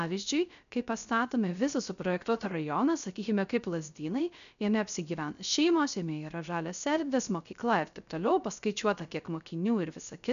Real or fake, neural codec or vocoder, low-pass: fake; codec, 16 kHz, 0.3 kbps, FocalCodec; 7.2 kHz